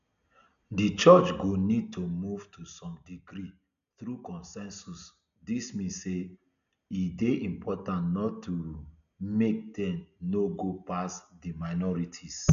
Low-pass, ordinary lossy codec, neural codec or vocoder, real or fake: 7.2 kHz; none; none; real